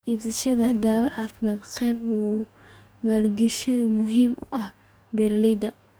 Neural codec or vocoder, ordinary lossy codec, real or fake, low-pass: codec, 44.1 kHz, 2.6 kbps, DAC; none; fake; none